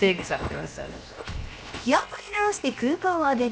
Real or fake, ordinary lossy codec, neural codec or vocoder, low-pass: fake; none; codec, 16 kHz, 0.7 kbps, FocalCodec; none